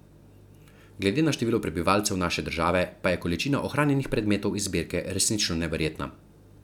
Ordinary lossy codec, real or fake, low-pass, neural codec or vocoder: none; real; 19.8 kHz; none